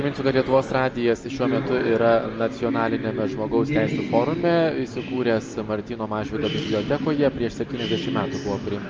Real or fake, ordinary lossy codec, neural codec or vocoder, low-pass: real; Opus, 24 kbps; none; 7.2 kHz